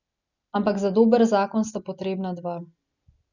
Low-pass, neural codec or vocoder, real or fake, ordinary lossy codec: 7.2 kHz; none; real; none